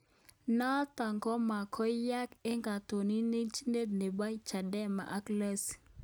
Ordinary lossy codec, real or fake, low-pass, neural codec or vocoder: none; real; none; none